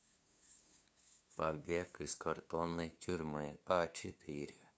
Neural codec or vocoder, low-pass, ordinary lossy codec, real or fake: codec, 16 kHz, 2 kbps, FunCodec, trained on LibriTTS, 25 frames a second; none; none; fake